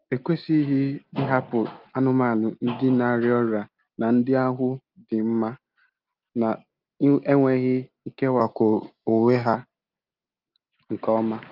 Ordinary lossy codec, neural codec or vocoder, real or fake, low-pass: Opus, 24 kbps; none; real; 5.4 kHz